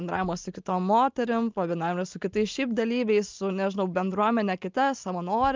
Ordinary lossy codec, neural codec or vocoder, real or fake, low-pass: Opus, 24 kbps; none; real; 7.2 kHz